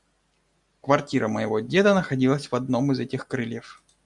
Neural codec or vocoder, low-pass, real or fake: none; 10.8 kHz; real